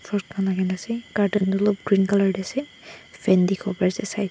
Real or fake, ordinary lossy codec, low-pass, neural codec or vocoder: real; none; none; none